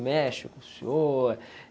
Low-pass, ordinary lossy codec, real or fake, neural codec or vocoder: none; none; real; none